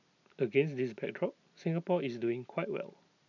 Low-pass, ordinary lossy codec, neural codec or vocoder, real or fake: 7.2 kHz; none; none; real